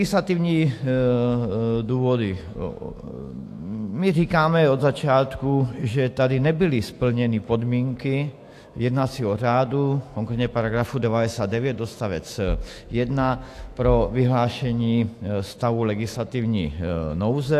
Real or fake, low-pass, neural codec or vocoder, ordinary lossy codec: fake; 14.4 kHz; autoencoder, 48 kHz, 128 numbers a frame, DAC-VAE, trained on Japanese speech; AAC, 64 kbps